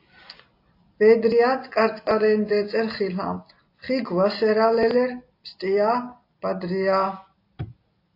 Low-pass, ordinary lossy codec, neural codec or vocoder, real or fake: 5.4 kHz; AAC, 32 kbps; none; real